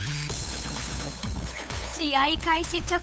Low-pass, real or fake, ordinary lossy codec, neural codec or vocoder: none; fake; none; codec, 16 kHz, 8 kbps, FunCodec, trained on LibriTTS, 25 frames a second